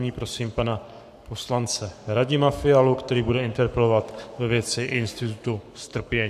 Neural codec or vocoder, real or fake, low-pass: codec, 44.1 kHz, 7.8 kbps, Pupu-Codec; fake; 14.4 kHz